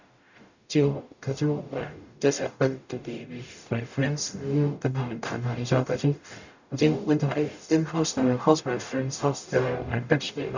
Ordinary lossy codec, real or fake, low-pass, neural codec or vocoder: none; fake; 7.2 kHz; codec, 44.1 kHz, 0.9 kbps, DAC